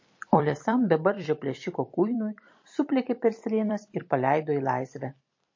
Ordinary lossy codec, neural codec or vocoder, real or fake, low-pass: MP3, 32 kbps; vocoder, 44.1 kHz, 128 mel bands every 256 samples, BigVGAN v2; fake; 7.2 kHz